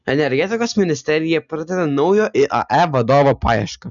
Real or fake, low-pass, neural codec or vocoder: real; 7.2 kHz; none